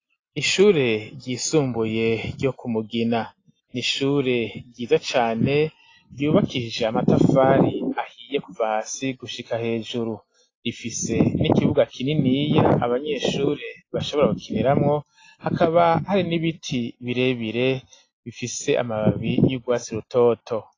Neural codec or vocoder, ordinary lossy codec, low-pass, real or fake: none; AAC, 32 kbps; 7.2 kHz; real